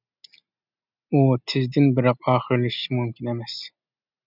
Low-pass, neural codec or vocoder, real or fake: 5.4 kHz; none; real